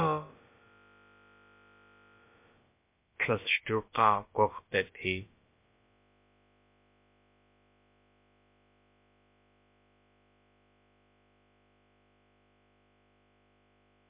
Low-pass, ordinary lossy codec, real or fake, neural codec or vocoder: 3.6 kHz; MP3, 24 kbps; fake; codec, 16 kHz, about 1 kbps, DyCAST, with the encoder's durations